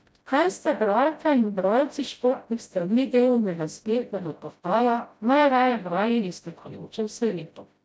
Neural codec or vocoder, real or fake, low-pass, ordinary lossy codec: codec, 16 kHz, 0.5 kbps, FreqCodec, smaller model; fake; none; none